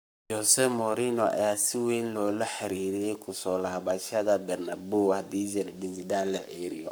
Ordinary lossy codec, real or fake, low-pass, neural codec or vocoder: none; fake; none; codec, 44.1 kHz, 7.8 kbps, Pupu-Codec